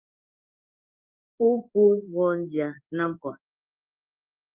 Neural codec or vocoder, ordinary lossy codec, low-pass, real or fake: codec, 16 kHz in and 24 kHz out, 1 kbps, XY-Tokenizer; Opus, 24 kbps; 3.6 kHz; fake